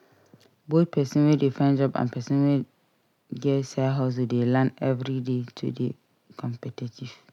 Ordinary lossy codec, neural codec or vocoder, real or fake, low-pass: none; none; real; 19.8 kHz